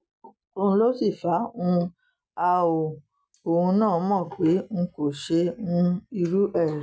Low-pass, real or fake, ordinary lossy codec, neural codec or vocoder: none; real; none; none